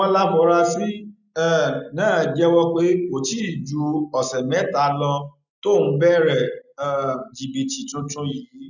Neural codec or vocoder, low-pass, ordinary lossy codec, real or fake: none; 7.2 kHz; none; real